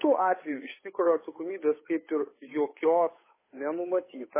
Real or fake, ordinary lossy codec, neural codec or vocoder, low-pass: fake; MP3, 16 kbps; codec, 16 kHz, 8 kbps, FunCodec, trained on Chinese and English, 25 frames a second; 3.6 kHz